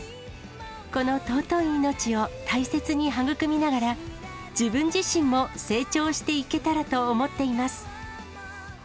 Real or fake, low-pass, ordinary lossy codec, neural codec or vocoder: real; none; none; none